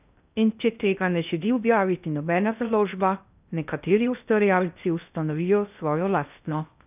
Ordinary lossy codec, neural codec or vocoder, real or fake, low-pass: none; codec, 16 kHz in and 24 kHz out, 0.6 kbps, FocalCodec, streaming, 2048 codes; fake; 3.6 kHz